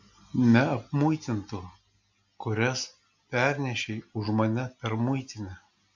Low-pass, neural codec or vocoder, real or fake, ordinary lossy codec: 7.2 kHz; none; real; MP3, 64 kbps